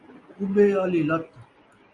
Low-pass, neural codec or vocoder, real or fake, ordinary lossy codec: 10.8 kHz; none; real; Opus, 64 kbps